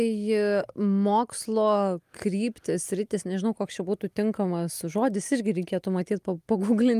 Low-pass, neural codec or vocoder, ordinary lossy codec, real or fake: 14.4 kHz; none; Opus, 32 kbps; real